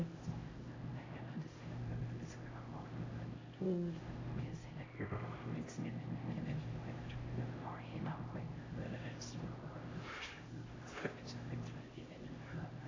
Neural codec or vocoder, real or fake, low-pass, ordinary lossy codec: codec, 16 kHz, 0.5 kbps, X-Codec, HuBERT features, trained on LibriSpeech; fake; 7.2 kHz; AAC, 32 kbps